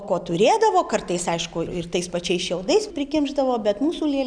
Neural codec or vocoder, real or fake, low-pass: none; real; 9.9 kHz